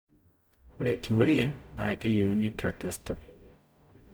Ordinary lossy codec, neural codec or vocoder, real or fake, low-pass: none; codec, 44.1 kHz, 0.9 kbps, DAC; fake; none